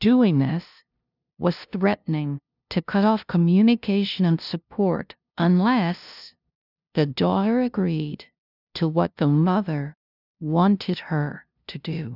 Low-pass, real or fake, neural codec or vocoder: 5.4 kHz; fake; codec, 16 kHz, 0.5 kbps, FunCodec, trained on LibriTTS, 25 frames a second